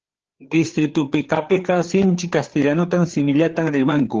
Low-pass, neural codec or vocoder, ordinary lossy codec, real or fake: 7.2 kHz; codec, 16 kHz, 4 kbps, FreqCodec, larger model; Opus, 16 kbps; fake